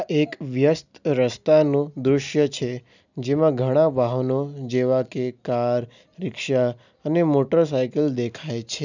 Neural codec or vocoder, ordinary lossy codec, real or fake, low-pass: none; none; real; 7.2 kHz